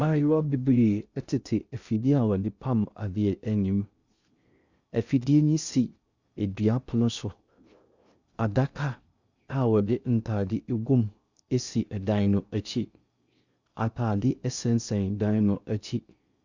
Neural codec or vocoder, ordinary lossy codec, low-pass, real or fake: codec, 16 kHz in and 24 kHz out, 0.6 kbps, FocalCodec, streaming, 2048 codes; Opus, 64 kbps; 7.2 kHz; fake